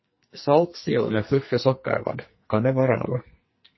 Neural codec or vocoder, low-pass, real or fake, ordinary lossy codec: codec, 44.1 kHz, 2.6 kbps, SNAC; 7.2 kHz; fake; MP3, 24 kbps